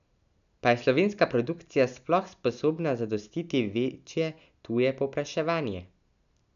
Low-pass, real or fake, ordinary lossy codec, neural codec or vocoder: 7.2 kHz; real; none; none